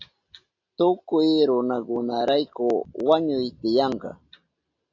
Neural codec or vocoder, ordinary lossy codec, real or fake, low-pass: none; AAC, 48 kbps; real; 7.2 kHz